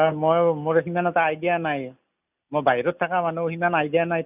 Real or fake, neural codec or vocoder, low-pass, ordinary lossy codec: real; none; 3.6 kHz; none